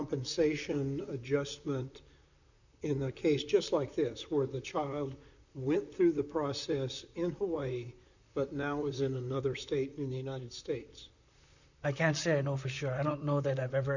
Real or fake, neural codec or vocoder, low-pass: fake; vocoder, 44.1 kHz, 128 mel bands, Pupu-Vocoder; 7.2 kHz